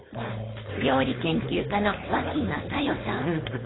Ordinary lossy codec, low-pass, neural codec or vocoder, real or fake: AAC, 16 kbps; 7.2 kHz; codec, 16 kHz, 4.8 kbps, FACodec; fake